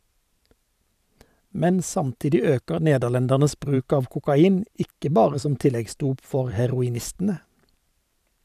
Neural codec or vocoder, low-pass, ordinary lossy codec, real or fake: vocoder, 44.1 kHz, 128 mel bands every 512 samples, BigVGAN v2; 14.4 kHz; none; fake